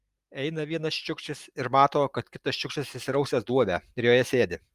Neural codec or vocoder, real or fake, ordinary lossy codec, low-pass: none; real; Opus, 32 kbps; 14.4 kHz